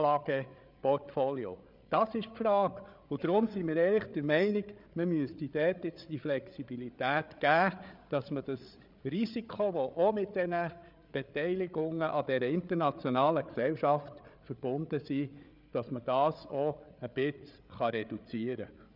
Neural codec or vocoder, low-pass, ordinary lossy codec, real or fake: codec, 16 kHz, 8 kbps, FreqCodec, larger model; 5.4 kHz; none; fake